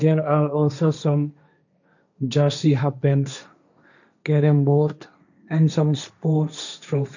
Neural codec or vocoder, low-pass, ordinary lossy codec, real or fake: codec, 16 kHz, 1.1 kbps, Voila-Tokenizer; 7.2 kHz; none; fake